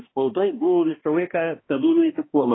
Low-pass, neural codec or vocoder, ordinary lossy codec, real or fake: 7.2 kHz; codec, 16 kHz, 1 kbps, X-Codec, HuBERT features, trained on balanced general audio; AAC, 16 kbps; fake